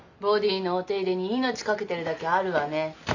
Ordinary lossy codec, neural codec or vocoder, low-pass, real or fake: none; none; 7.2 kHz; real